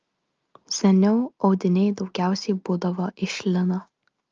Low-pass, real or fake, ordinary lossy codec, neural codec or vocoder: 7.2 kHz; real; Opus, 24 kbps; none